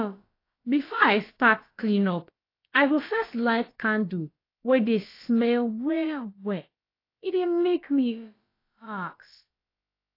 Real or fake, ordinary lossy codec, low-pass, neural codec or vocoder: fake; AAC, 32 kbps; 5.4 kHz; codec, 16 kHz, about 1 kbps, DyCAST, with the encoder's durations